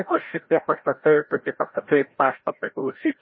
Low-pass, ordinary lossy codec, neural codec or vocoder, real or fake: 7.2 kHz; MP3, 24 kbps; codec, 16 kHz, 0.5 kbps, FreqCodec, larger model; fake